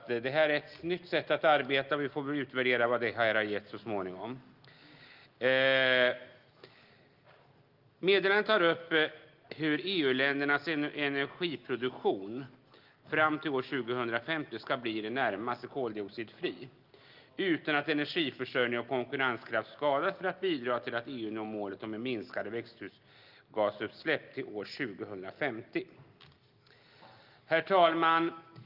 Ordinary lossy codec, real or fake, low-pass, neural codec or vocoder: Opus, 32 kbps; real; 5.4 kHz; none